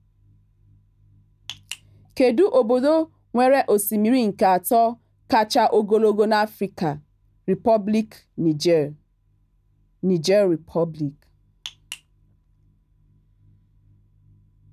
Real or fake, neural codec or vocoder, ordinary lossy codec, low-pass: real; none; none; 14.4 kHz